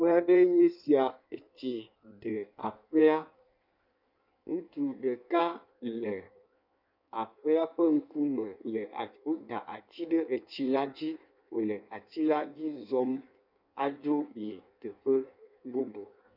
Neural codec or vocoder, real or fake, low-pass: codec, 16 kHz in and 24 kHz out, 1.1 kbps, FireRedTTS-2 codec; fake; 5.4 kHz